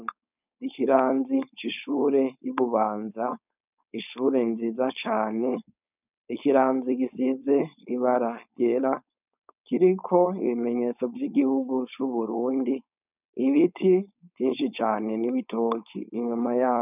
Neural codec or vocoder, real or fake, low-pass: codec, 16 kHz, 4.8 kbps, FACodec; fake; 3.6 kHz